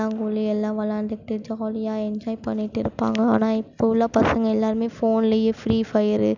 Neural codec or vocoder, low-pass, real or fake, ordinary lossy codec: none; 7.2 kHz; real; none